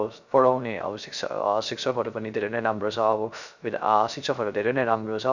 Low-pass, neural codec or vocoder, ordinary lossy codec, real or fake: 7.2 kHz; codec, 16 kHz, 0.3 kbps, FocalCodec; none; fake